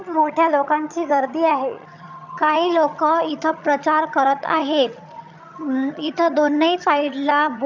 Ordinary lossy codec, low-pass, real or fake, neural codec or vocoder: none; 7.2 kHz; fake; vocoder, 22.05 kHz, 80 mel bands, HiFi-GAN